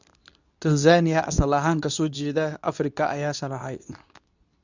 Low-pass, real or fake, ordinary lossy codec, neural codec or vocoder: 7.2 kHz; fake; none; codec, 24 kHz, 0.9 kbps, WavTokenizer, medium speech release version 2